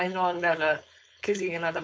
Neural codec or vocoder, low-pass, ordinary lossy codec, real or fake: codec, 16 kHz, 4.8 kbps, FACodec; none; none; fake